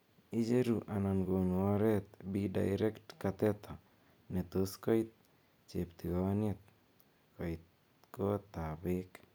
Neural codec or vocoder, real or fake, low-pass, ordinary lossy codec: none; real; none; none